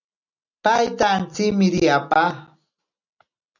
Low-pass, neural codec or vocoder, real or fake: 7.2 kHz; none; real